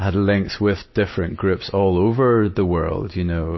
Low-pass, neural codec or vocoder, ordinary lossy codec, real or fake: 7.2 kHz; none; MP3, 24 kbps; real